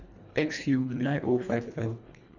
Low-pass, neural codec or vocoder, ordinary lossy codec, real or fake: 7.2 kHz; codec, 24 kHz, 1.5 kbps, HILCodec; none; fake